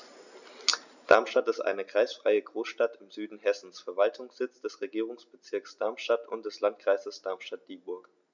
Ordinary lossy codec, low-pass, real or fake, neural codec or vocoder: none; 7.2 kHz; real; none